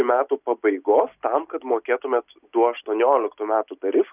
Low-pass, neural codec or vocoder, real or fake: 3.6 kHz; none; real